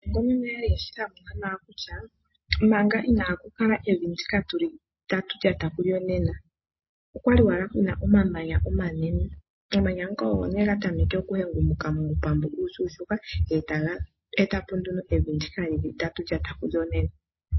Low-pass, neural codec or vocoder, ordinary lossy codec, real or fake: 7.2 kHz; none; MP3, 24 kbps; real